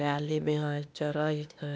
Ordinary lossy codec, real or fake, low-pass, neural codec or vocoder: none; fake; none; codec, 16 kHz, 0.8 kbps, ZipCodec